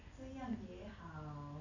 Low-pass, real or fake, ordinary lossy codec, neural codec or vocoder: 7.2 kHz; real; none; none